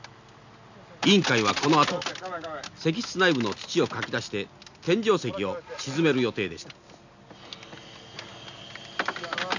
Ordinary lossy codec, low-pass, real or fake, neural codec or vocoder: none; 7.2 kHz; real; none